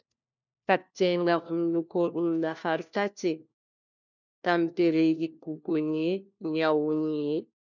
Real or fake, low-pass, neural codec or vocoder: fake; 7.2 kHz; codec, 16 kHz, 1 kbps, FunCodec, trained on LibriTTS, 50 frames a second